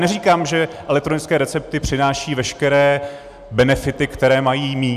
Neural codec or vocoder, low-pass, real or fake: none; 14.4 kHz; real